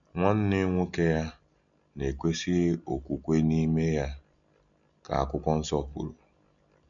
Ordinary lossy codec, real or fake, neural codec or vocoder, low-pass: none; real; none; 7.2 kHz